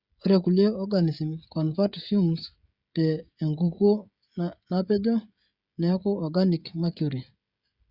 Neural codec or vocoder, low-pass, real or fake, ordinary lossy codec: codec, 16 kHz, 16 kbps, FreqCodec, smaller model; 5.4 kHz; fake; Opus, 64 kbps